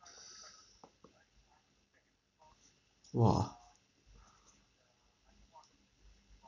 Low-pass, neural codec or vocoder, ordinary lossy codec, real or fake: 7.2 kHz; none; none; real